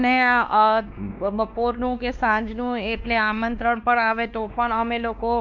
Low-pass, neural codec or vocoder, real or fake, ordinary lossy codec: 7.2 kHz; codec, 16 kHz, 2 kbps, X-Codec, WavLM features, trained on Multilingual LibriSpeech; fake; none